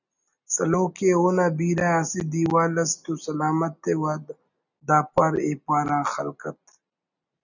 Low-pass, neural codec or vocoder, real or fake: 7.2 kHz; none; real